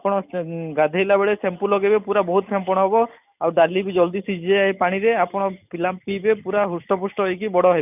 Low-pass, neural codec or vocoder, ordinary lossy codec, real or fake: 3.6 kHz; none; none; real